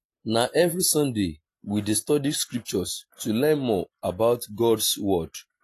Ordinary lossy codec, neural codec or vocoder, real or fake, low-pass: AAC, 48 kbps; none; real; 14.4 kHz